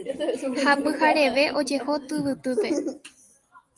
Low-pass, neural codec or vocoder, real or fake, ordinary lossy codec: 10.8 kHz; none; real; Opus, 32 kbps